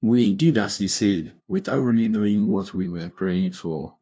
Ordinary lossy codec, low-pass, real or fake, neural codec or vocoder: none; none; fake; codec, 16 kHz, 0.5 kbps, FunCodec, trained on LibriTTS, 25 frames a second